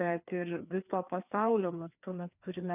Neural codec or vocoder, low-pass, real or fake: codec, 16 kHz, 2 kbps, FreqCodec, larger model; 3.6 kHz; fake